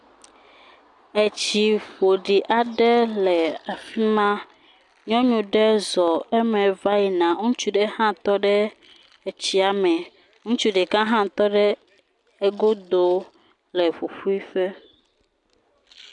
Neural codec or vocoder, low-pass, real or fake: none; 10.8 kHz; real